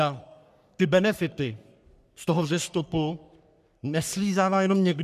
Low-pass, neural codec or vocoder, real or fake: 14.4 kHz; codec, 44.1 kHz, 3.4 kbps, Pupu-Codec; fake